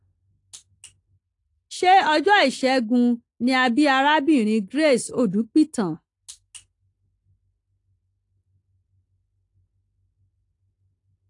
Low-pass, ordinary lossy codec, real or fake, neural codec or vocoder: 10.8 kHz; AAC, 48 kbps; real; none